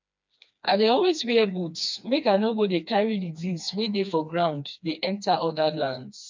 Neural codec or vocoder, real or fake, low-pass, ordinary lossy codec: codec, 16 kHz, 2 kbps, FreqCodec, smaller model; fake; 7.2 kHz; MP3, 64 kbps